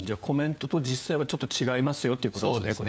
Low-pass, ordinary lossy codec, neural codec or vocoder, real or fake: none; none; codec, 16 kHz, 4 kbps, FunCodec, trained on LibriTTS, 50 frames a second; fake